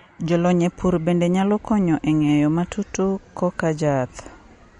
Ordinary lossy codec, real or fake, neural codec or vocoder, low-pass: MP3, 48 kbps; real; none; 19.8 kHz